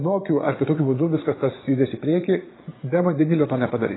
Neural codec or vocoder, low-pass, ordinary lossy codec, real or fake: vocoder, 22.05 kHz, 80 mel bands, Vocos; 7.2 kHz; AAC, 16 kbps; fake